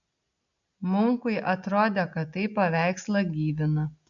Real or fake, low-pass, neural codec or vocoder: real; 7.2 kHz; none